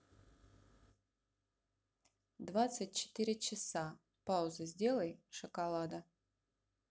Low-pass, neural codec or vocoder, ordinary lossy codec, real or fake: none; none; none; real